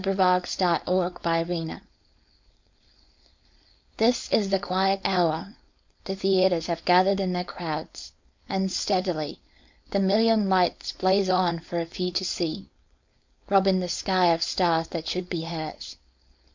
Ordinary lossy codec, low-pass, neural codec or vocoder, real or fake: MP3, 64 kbps; 7.2 kHz; codec, 16 kHz, 4.8 kbps, FACodec; fake